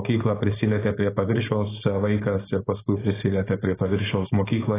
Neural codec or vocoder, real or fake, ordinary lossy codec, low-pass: codec, 16 kHz, 4.8 kbps, FACodec; fake; AAC, 16 kbps; 3.6 kHz